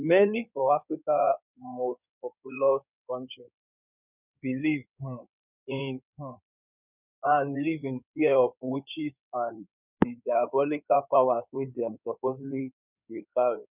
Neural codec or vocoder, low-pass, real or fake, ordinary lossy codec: codec, 16 kHz in and 24 kHz out, 2.2 kbps, FireRedTTS-2 codec; 3.6 kHz; fake; none